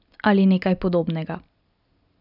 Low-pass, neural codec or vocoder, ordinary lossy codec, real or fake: 5.4 kHz; none; none; real